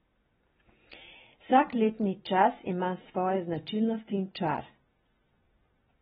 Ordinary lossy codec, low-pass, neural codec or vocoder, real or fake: AAC, 16 kbps; 7.2 kHz; none; real